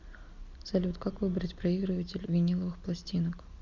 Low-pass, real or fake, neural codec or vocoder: 7.2 kHz; real; none